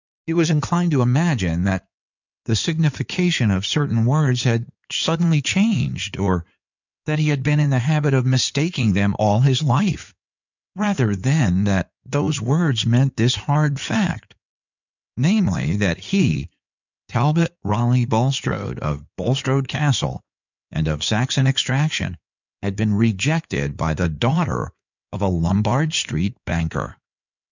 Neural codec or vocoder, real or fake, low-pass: codec, 16 kHz in and 24 kHz out, 2.2 kbps, FireRedTTS-2 codec; fake; 7.2 kHz